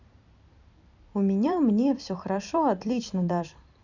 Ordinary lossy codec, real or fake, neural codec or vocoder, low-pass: none; fake; vocoder, 44.1 kHz, 128 mel bands every 256 samples, BigVGAN v2; 7.2 kHz